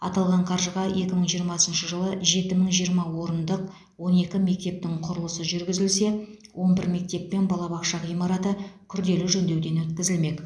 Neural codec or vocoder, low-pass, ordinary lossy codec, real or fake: none; none; none; real